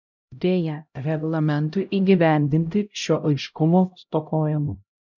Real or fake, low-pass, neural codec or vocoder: fake; 7.2 kHz; codec, 16 kHz, 0.5 kbps, X-Codec, HuBERT features, trained on LibriSpeech